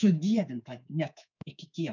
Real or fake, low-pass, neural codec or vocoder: fake; 7.2 kHz; codec, 24 kHz, 3.1 kbps, DualCodec